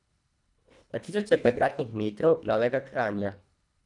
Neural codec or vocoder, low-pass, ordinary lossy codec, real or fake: codec, 24 kHz, 1.5 kbps, HILCodec; 10.8 kHz; none; fake